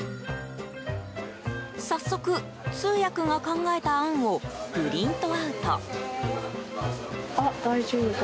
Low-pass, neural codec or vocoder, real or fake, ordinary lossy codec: none; none; real; none